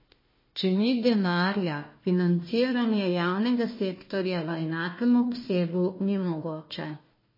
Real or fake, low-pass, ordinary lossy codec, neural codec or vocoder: fake; 5.4 kHz; MP3, 24 kbps; codec, 16 kHz, 1 kbps, FunCodec, trained on Chinese and English, 50 frames a second